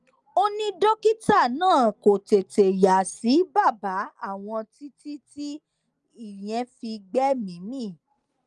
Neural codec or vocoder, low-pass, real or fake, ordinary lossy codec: none; 10.8 kHz; real; Opus, 32 kbps